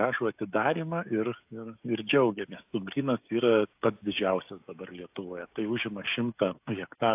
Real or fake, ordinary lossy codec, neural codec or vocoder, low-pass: fake; AAC, 32 kbps; codec, 16 kHz, 16 kbps, FreqCodec, smaller model; 3.6 kHz